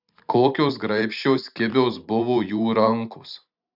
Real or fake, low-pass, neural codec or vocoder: fake; 5.4 kHz; vocoder, 44.1 kHz, 128 mel bands every 512 samples, BigVGAN v2